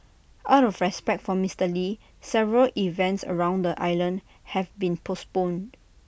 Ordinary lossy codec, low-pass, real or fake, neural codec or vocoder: none; none; real; none